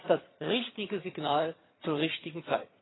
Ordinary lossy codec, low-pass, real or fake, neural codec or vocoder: AAC, 16 kbps; 7.2 kHz; fake; vocoder, 22.05 kHz, 80 mel bands, HiFi-GAN